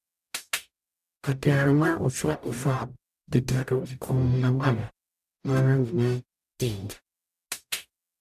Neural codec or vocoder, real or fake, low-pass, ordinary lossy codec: codec, 44.1 kHz, 0.9 kbps, DAC; fake; 14.4 kHz; none